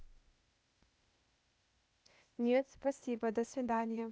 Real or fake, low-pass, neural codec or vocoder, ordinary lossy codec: fake; none; codec, 16 kHz, 0.8 kbps, ZipCodec; none